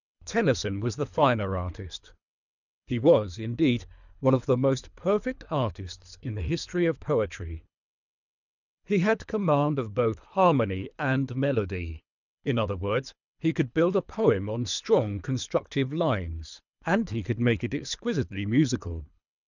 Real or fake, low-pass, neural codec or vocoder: fake; 7.2 kHz; codec, 24 kHz, 3 kbps, HILCodec